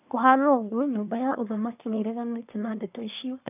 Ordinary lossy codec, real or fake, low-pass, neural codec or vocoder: none; fake; 3.6 kHz; codec, 24 kHz, 1 kbps, SNAC